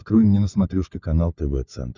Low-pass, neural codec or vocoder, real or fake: 7.2 kHz; codec, 16 kHz, 16 kbps, FunCodec, trained on LibriTTS, 50 frames a second; fake